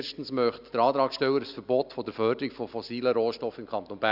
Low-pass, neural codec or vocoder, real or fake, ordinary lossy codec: 5.4 kHz; none; real; none